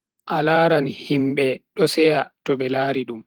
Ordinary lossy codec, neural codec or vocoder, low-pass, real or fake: Opus, 24 kbps; vocoder, 44.1 kHz, 128 mel bands every 512 samples, BigVGAN v2; 19.8 kHz; fake